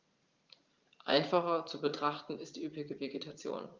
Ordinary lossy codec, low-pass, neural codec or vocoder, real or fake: Opus, 32 kbps; 7.2 kHz; vocoder, 44.1 kHz, 80 mel bands, Vocos; fake